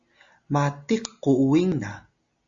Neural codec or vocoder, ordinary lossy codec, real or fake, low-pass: none; Opus, 64 kbps; real; 7.2 kHz